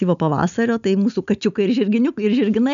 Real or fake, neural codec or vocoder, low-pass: real; none; 7.2 kHz